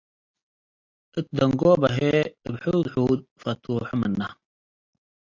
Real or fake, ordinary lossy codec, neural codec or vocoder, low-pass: real; AAC, 48 kbps; none; 7.2 kHz